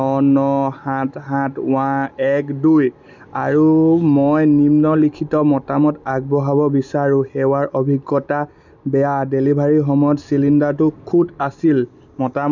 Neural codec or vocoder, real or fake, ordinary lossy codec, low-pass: none; real; none; 7.2 kHz